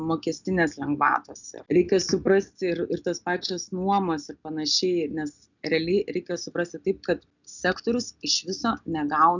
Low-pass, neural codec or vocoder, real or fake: 7.2 kHz; none; real